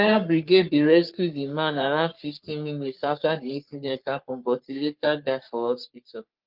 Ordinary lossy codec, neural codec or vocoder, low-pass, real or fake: Opus, 32 kbps; codec, 44.1 kHz, 3.4 kbps, Pupu-Codec; 5.4 kHz; fake